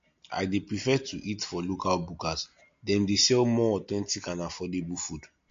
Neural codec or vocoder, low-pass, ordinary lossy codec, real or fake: none; 7.2 kHz; MP3, 48 kbps; real